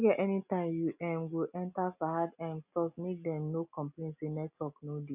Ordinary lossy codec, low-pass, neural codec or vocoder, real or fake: none; 3.6 kHz; none; real